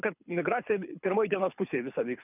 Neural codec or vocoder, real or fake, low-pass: codec, 24 kHz, 6 kbps, HILCodec; fake; 3.6 kHz